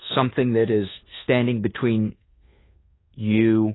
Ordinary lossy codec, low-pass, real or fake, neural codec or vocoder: AAC, 16 kbps; 7.2 kHz; fake; codec, 24 kHz, 1.2 kbps, DualCodec